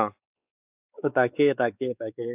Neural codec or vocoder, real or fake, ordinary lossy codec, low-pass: codec, 16 kHz, 4 kbps, X-Codec, WavLM features, trained on Multilingual LibriSpeech; fake; AAC, 32 kbps; 3.6 kHz